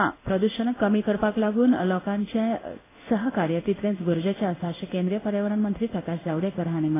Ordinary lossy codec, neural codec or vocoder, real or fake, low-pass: AAC, 16 kbps; codec, 16 kHz in and 24 kHz out, 1 kbps, XY-Tokenizer; fake; 3.6 kHz